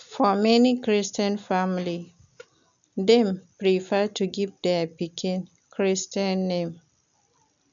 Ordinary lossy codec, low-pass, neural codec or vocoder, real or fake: none; 7.2 kHz; none; real